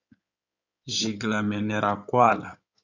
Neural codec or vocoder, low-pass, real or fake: codec, 16 kHz in and 24 kHz out, 2.2 kbps, FireRedTTS-2 codec; 7.2 kHz; fake